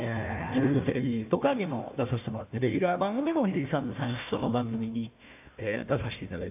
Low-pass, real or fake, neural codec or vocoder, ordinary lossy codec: 3.6 kHz; fake; codec, 16 kHz, 1 kbps, FunCodec, trained on Chinese and English, 50 frames a second; AAC, 32 kbps